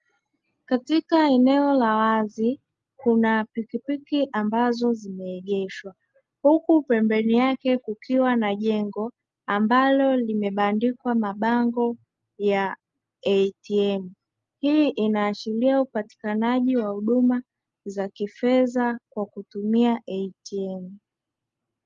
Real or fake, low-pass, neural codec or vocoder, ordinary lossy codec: real; 7.2 kHz; none; Opus, 32 kbps